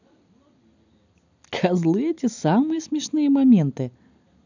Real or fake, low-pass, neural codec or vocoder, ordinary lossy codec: real; 7.2 kHz; none; none